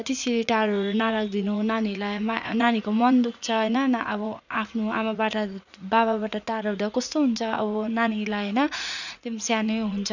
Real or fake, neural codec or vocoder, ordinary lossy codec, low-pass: fake; vocoder, 44.1 kHz, 80 mel bands, Vocos; none; 7.2 kHz